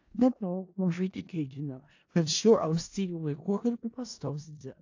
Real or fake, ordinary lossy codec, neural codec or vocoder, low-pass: fake; AAC, 48 kbps; codec, 16 kHz in and 24 kHz out, 0.4 kbps, LongCat-Audio-Codec, four codebook decoder; 7.2 kHz